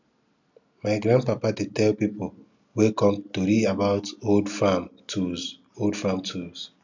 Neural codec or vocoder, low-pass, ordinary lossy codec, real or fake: none; 7.2 kHz; none; real